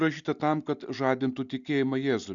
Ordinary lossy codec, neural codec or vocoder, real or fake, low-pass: Opus, 64 kbps; none; real; 7.2 kHz